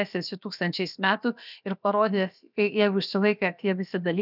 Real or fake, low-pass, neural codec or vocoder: fake; 5.4 kHz; codec, 16 kHz, about 1 kbps, DyCAST, with the encoder's durations